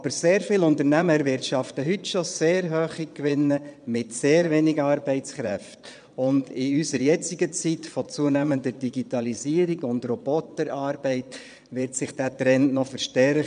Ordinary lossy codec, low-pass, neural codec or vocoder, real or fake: none; 9.9 kHz; vocoder, 22.05 kHz, 80 mel bands, Vocos; fake